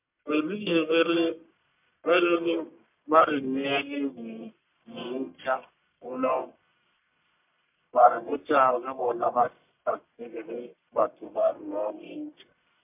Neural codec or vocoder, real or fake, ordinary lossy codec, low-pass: codec, 44.1 kHz, 1.7 kbps, Pupu-Codec; fake; MP3, 32 kbps; 3.6 kHz